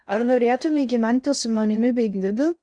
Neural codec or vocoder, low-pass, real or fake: codec, 16 kHz in and 24 kHz out, 0.6 kbps, FocalCodec, streaming, 4096 codes; 9.9 kHz; fake